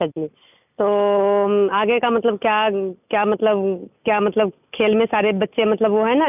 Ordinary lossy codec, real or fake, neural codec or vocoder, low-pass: none; real; none; 3.6 kHz